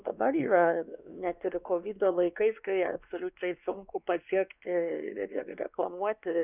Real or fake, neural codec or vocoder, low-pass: fake; codec, 16 kHz, 2 kbps, X-Codec, WavLM features, trained on Multilingual LibriSpeech; 3.6 kHz